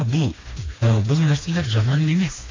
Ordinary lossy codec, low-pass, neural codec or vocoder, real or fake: AAC, 32 kbps; 7.2 kHz; codec, 16 kHz, 2 kbps, FreqCodec, smaller model; fake